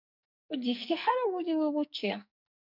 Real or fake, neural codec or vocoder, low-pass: fake; codec, 44.1 kHz, 2.6 kbps, SNAC; 5.4 kHz